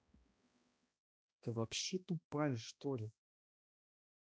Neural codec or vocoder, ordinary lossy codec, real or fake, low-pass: codec, 16 kHz, 1 kbps, X-Codec, HuBERT features, trained on balanced general audio; none; fake; none